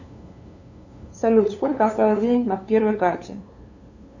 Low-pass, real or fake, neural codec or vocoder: 7.2 kHz; fake; codec, 16 kHz, 2 kbps, FunCodec, trained on LibriTTS, 25 frames a second